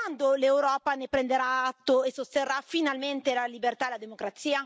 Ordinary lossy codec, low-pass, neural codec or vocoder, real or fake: none; none; none; real